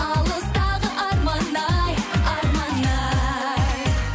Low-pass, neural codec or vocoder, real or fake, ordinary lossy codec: none; none; real; none